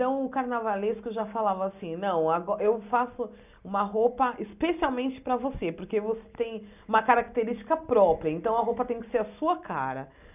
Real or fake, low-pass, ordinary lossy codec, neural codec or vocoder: real; 3.6 kHz; none; none